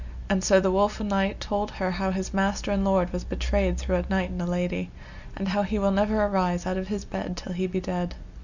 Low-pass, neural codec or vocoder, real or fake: 7.2 kHz; none; real